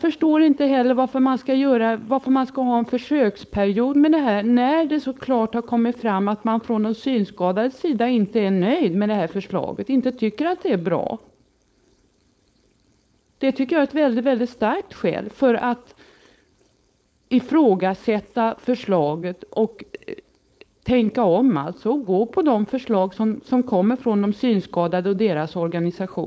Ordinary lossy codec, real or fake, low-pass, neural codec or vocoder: none; fake; none; codec, 16 kHz, 4.8 kbps, FACodec